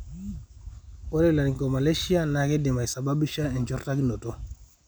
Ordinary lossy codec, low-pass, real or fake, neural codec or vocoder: none; none; real; none